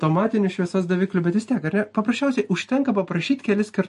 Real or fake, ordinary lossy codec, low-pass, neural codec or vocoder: real; MP3, 48 kbps; 14.4 kHz; none